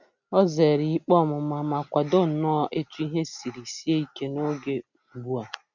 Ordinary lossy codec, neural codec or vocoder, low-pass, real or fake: none; none; 7.2 kHz; real